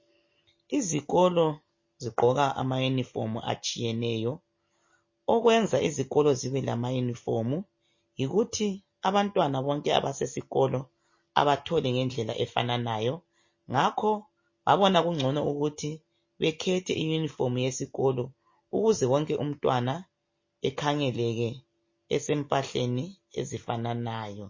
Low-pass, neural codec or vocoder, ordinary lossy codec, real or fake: 7.2 kHz; none; MP3, 32 kbps; real